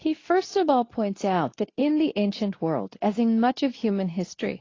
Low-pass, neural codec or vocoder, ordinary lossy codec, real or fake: 7.2 kHz; codec, 24 kHz, 0.9 kbps, WavTokenizer, medium speech release version 2; AAC, 32 kbps; fake